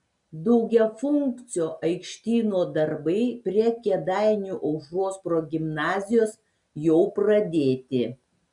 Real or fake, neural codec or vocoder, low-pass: real; none; 10.8 kHz